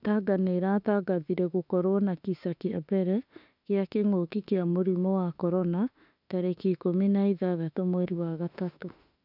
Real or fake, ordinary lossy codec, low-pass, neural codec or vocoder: fake; none; 5.4 kHz; autoencoder, 48 kHz, 32 numbers a frame, DAC-VAE, trained on Japanese speech